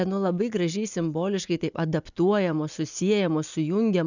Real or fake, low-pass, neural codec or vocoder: real; 7.2 kHz; none